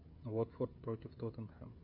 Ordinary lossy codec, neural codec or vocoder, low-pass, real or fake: AAC, 48 kbps; codec, 16 kHz, 16 kbps, FreqCodec, smaller model; 5.4 kHz; fake